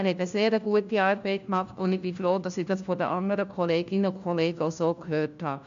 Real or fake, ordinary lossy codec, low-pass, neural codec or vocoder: fake; MP3, 64 kbps; 7.2 kHz; codec, 16 kHz, 1 kbps, FunCodec, trained on LibriTTS, 50 frames a second